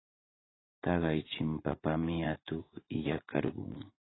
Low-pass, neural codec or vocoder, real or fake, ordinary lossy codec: 7.2 kHz; vocoder, 44.1 kHz, 80 mel bands, Vocos; fake; AAC, 16 kbps